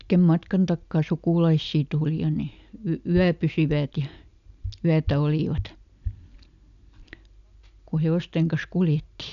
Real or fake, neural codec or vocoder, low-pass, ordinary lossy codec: real; none; 7.2 kHz; AAC, 96 kbps